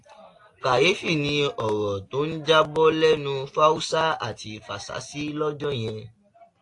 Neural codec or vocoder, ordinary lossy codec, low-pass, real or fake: none; AAC, 48 kbps; 10.8 kHz; real